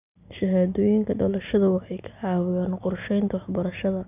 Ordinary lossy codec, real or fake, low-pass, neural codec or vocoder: none; real; 3.6 kHz; none